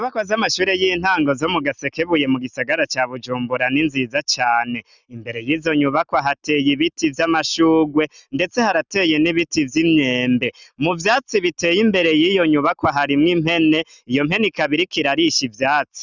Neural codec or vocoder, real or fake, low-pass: none; real; 7.2 kHz